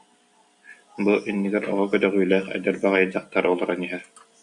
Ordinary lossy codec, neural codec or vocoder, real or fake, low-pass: MP3, 64 kbps; none; real; 10.8 kHz